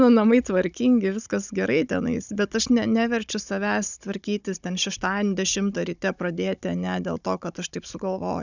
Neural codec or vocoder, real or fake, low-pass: codec, 16 kHz, 16 kbps, FunCodec, trained on Chinese and English, 50 frames a second; fake; 7.2 kHz